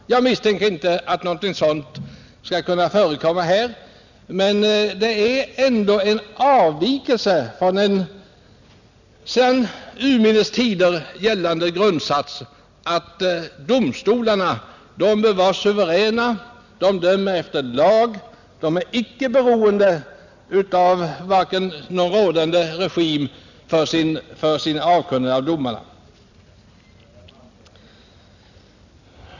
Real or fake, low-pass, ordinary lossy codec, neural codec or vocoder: real; 7.2 kHz; none; none